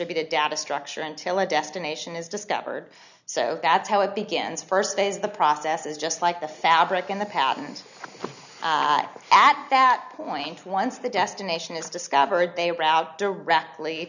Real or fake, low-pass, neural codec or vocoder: real; 7.2 kHz; none